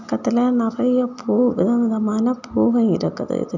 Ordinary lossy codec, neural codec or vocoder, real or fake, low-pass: none; none; real; 7.2 kHz